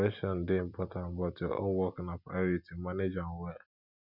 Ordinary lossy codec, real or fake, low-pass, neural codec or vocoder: none; real; 5.4 kHz; none